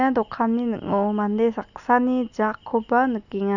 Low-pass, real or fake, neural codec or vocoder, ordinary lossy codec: 7.2 kHz; real; none; none